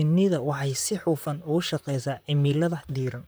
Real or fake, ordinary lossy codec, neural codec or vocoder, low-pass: fake; none; vocoder, 44.1 kHz, 128 mel bands, Pupu-Vocoder; none